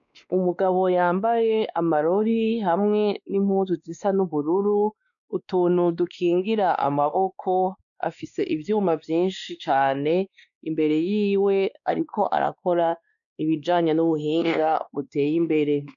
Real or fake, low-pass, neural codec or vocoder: fake; 7.2 kHz; codec, 16 kHz, 2 kbps, X-Codec, WavLM features, trained on Multilingual LibriSpeech